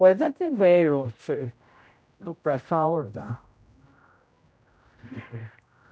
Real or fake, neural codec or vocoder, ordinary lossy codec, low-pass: fake; codec, 16 kHz, 0.5 kbps, X-Codec, HuBERT features, trained on general audio; none; none